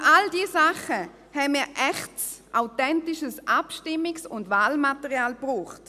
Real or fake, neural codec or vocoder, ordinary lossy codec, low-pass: real; none; none; 14.4 kHz